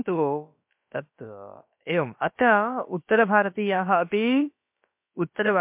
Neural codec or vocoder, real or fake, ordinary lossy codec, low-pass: codec, 16 kHz, about 1 kbps, DyCAST, with the encoder's durations; fake; MP3, 32 kbps; 3.6 kHz